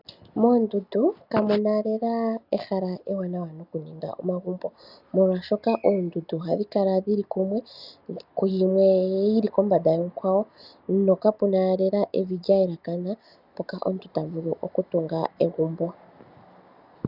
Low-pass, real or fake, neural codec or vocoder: 5.4 kHz; real; none